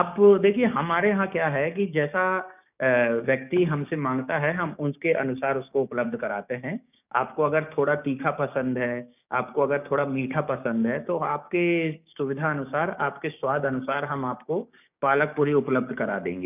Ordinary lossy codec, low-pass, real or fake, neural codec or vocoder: none; 3.6 kHz; fake; codec, 16 kHz, 6 kbps, DAC